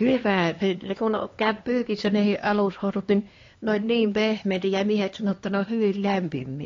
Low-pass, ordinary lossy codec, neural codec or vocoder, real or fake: 7.2 kHz; AAC, 32 kbps; codec, 16 kHz, 2 kbps, X-Codec, HuBERT features, trained on LibriSpeech; fake